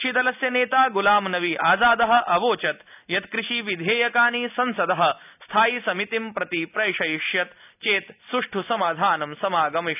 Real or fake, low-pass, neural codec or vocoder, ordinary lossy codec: real; 3.6 kHz; none; none